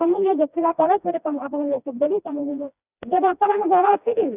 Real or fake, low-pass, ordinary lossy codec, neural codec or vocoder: fake; 3.6 kHz; none; codec, 16 kHz, 1 kbps, FreqCodec, smaller model